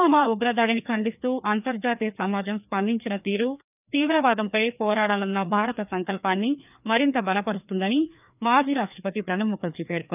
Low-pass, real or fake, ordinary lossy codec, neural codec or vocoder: 3.6 kHz; fake; none; codec, 16 kHz, 2 kbps, FreqCodec, larger model